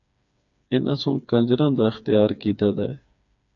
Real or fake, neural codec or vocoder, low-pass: fake; codec, 16 kHz, 4 kbps, FreqCodec, smaller model; 7.2 kHz